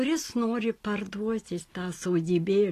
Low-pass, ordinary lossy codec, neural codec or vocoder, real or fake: 14.4 kHz; AAC, 48 kbps; none; real